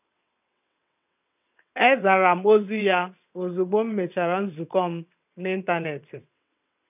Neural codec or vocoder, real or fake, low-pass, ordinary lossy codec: vocoder, 22.05 kHz, 80 mel bands, WaveNeXt; fake; 3.6 kHz; none